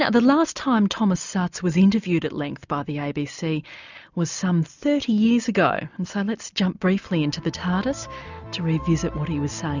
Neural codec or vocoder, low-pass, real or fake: none; 7.2 kHz; real